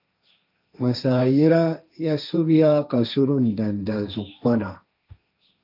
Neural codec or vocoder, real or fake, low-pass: codec, 16 kHz, 1.1 kbps, Voila-Tokenizer; fake; 5.4 kHz